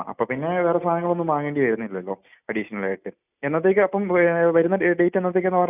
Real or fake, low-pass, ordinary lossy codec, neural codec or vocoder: real; 3.6 kHz; none; none